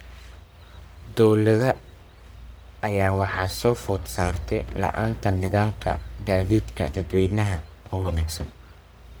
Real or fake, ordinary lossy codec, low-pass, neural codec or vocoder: fake; none; none; codec, 44.1 kHz, 1.7 kbps, Pupu-Codec